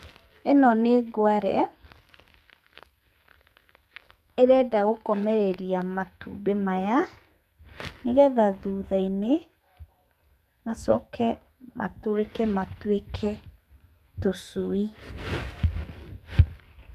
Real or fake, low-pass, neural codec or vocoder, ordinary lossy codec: fake; 14.4 kHz; codec, 44.1 kHz, 2.6 kbps, SNAC; none